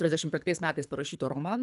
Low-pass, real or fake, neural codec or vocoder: 10.8 kHz; fake; codec, 24 kHz, 3 kbps, HILCodec